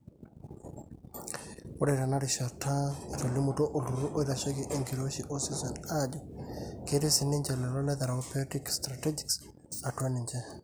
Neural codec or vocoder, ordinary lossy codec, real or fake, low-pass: none; none; real; none